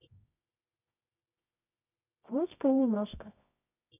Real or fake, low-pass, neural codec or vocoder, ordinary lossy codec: fake; 3.6 kHz; codec, 24 kHz, 0.9 kbps, WavTokenizer, medium music audio release; none